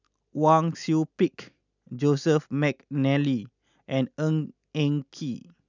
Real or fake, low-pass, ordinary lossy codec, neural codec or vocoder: real; 7.2 kHz; none; none